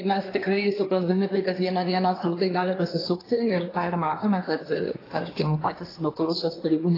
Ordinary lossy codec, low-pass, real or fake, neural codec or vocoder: AAC, 24 kbps; 5.4 kHz; fake; codec, 24 kHz, 1 kbps, SNAC